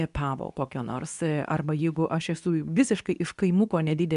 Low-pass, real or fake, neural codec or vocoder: 10.8 kHz; fake; codec, 24 kHz, 0.9 kbps, WavTokenizer, medium speech release version 2